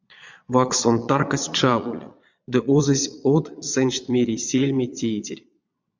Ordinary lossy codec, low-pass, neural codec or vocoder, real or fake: MP3, 64 kbps; 7.2 kHz; vocoder, 22.05 kHz, 80 mel bands, Vocos; fake